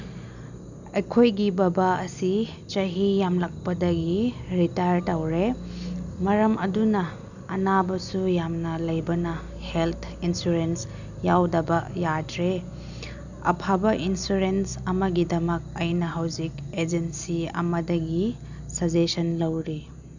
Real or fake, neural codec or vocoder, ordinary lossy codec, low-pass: real; none; none; 7.2 kHz